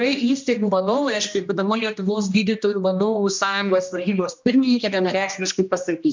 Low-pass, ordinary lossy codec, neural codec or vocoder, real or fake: 7.2 kHz; MP3, 64 kbps; codec, 16 kHz, 1 kbps, X-Codec, HuBERT features, trained on general audio; fake